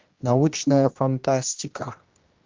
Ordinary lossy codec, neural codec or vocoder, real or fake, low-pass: Opus, 32 kbps; codec, 16 kHz, 1 kbps, X-Codec, HuBERT features, trained on general audio; fake; 7.2 kHz